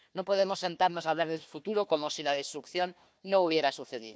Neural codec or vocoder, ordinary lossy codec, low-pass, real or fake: codec, 16 kHz, 1 kbps, FunCodec, trained on Chinese and English, 50 frames a second; none; none; fake